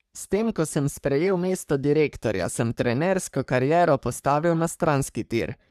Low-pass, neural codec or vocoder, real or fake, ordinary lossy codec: 14.4 kHz; codec, 44.1 kHz, 3.4 kbps, Pupu-Codec; fake; none